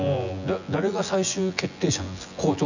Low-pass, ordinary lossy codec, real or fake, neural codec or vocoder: 7.2 kHz; none; fake; vocoder, 24 kHz, 100 mel bands, Vocos